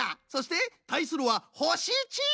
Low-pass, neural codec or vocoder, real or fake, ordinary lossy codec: none; none; real; none